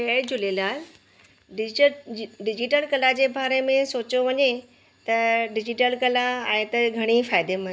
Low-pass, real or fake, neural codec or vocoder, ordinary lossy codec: none; real; none; none